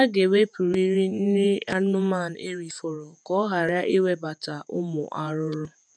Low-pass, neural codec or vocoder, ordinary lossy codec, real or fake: 9.9 kHz; vocoder, 48 kHz, 128 mel bands, Vocos; none; fake